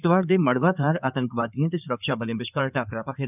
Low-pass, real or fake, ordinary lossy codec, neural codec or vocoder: 3.6 kHz; fake; none; codec, 16 kHz in and 24 kHz out, 2.2 kbps, FireRedTTS-2 codec